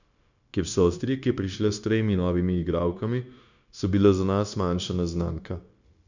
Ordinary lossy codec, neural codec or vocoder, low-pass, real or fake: none; codec, 16 kHz, 0.9 kbps, LongCat-Audio-Codec; 7.2 kHz; fake